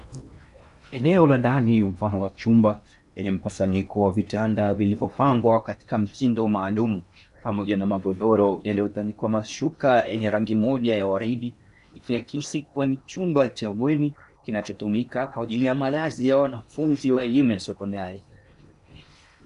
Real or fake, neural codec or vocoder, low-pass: fake; codec, 16 kHz in and 24 kHz out, 0.8 kbps, FocalCodec, streaming, 65536 codes; 10.8 kHz